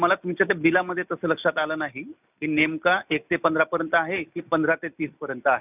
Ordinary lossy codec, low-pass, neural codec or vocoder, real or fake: none; 3.6 kHz; none; real